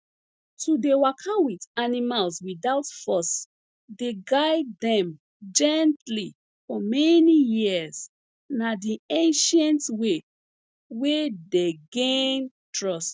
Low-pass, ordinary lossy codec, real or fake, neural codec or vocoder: none; none; real; none